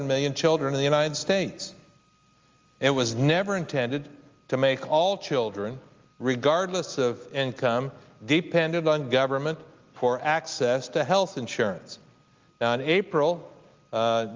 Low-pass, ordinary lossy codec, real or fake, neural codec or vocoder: 7.2 kHz; Opus, 32 kbps; real; none